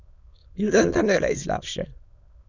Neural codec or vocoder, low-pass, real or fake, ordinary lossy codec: autoencoder, 22.05 kHz, a latent of 192 numbers a frame, VITS, trained on many speakers; 7.2 kHz; fake; none